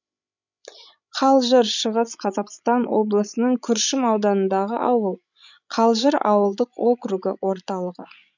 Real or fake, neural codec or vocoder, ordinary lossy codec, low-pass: fake; codec, 16 kHz, 16 kbps, FreqCodec, larger model; none; 7.2 kHz